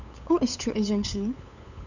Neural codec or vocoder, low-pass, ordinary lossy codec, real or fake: codec, 16 kHz, 8 kbps, FunCodec, trained on LibriTTS, 25 frames a second; 7.2 kHz; none; fake